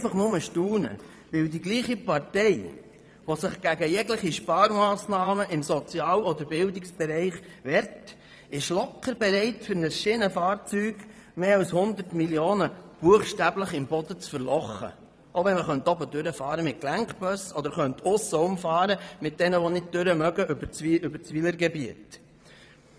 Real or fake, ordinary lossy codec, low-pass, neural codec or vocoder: fake; none; none; vocoder, 22.05 kHz, 80 mel bands, Vocos